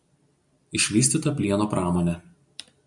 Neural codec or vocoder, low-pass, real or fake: none; 10.8 kHz; real